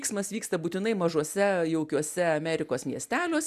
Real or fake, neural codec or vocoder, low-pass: real; none; 14.4 kHz